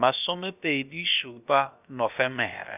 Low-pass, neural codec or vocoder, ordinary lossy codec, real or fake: 3.6 kHz; codec, 16 kHz, about 1 kbps, DyCAST, with the encoder's durations; none; fake